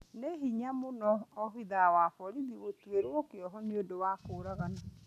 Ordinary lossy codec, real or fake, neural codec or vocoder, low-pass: none; real; none; 14.4 kHz